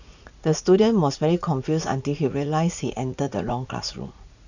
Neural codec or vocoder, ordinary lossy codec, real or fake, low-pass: vocoder, 44.1 kHz, 80 mel bands, Vocos; none; fake; 7.2 kHz